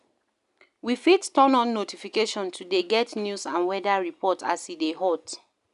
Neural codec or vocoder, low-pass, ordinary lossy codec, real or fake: none; 10.8 kHz; none; real